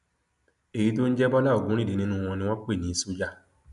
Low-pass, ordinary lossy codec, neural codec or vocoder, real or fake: 10.8 kHz; AAC, 96 kbps; none; real